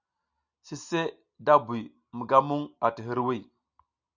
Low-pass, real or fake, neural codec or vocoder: 7.2 kHz; real; none